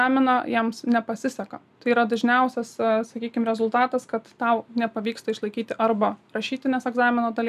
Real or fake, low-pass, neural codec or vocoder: real; 14.4 kHz; none